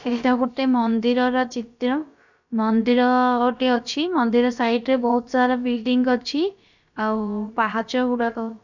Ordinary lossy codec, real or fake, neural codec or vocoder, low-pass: none; fake; codec, 16 kHz, about 1 kbps, DyCAST, with the encoder's durations; 7.2 kHz